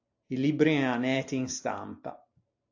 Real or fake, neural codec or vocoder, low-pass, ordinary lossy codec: real; none; 7.2 kHz; AAC, 48 kbps